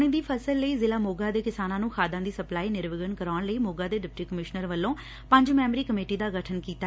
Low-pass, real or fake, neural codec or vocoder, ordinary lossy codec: none; real; none; none